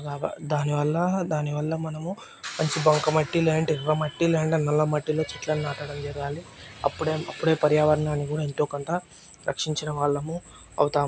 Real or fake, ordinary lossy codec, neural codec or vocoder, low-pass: real; none; none; none